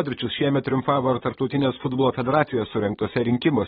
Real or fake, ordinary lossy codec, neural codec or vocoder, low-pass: fake; AAC, 16 kbps; vocoder, 44.1 kHz, 128 mel bands, Pupu-Vocoder; 19.8 kHz